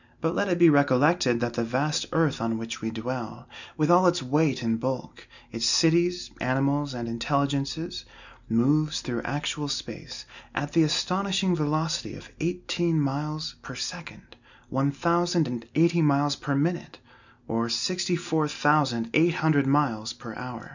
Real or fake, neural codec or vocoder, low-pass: real; none; 7.2 kHz